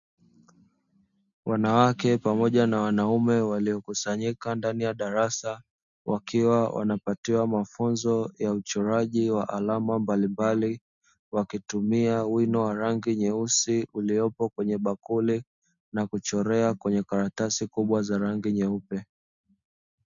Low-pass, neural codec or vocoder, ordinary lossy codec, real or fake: 7.2 kHz; none; MP3, 96 kbps; real